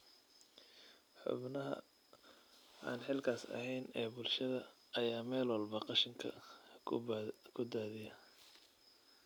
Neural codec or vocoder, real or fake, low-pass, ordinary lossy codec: none; real; none; none